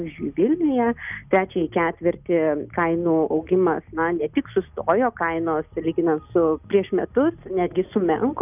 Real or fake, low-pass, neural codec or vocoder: real; 3.6 kHz; none